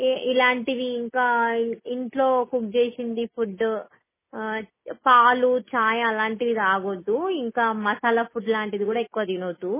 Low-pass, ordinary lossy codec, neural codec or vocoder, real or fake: 3.6 kHz; MP3, 16 kbps; none; real